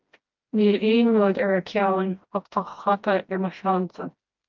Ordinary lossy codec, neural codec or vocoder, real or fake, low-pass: Opus, 24 kbps; codec, 16 kHz, 1 kbps, FreqCodec, smaller model; fake; 7.2 kHz